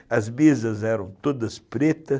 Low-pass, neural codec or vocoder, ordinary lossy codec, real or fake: none; none; none; real